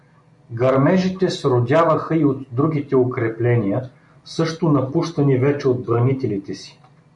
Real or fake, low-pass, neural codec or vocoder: real; 10.8 kHz; none